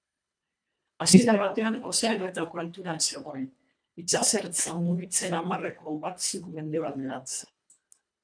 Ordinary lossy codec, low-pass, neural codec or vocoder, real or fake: MP3, 96 kbps; 9.9 kHz; codec, 24 kHz, 1.5 kbps, HILCodec; fake